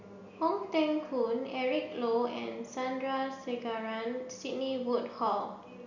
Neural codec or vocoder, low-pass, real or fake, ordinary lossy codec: none; 7.2 kHz; real; none